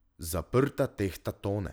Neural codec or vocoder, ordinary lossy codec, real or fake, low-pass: none; none; real; none